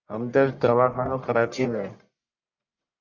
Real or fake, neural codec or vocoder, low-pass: fake; codec, 44.1 kHz, 1.7 kbps, Pupu-Codec; 7.2 kHz